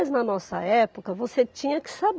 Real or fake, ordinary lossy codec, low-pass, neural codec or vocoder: real; none; none; none